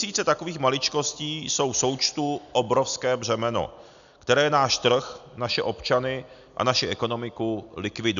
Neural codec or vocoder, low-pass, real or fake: none; 7.2 kHz; real